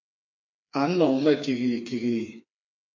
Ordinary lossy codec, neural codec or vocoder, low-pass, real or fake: MP3, 48 kbps; codec, 16 kHz, 4 kbps, FreqCodec, smaller model; 7.2 kHz; fake